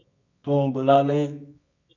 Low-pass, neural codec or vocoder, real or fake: 7.2 kHz; codec, 24 kHz, 0.9 kbps, WavTokenizer, medium music audio release; fake